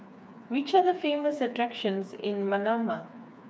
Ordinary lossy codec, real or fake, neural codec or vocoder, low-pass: none; fake; codec, 16 kHz, 4 kbps, FreqCodec, smaller model; none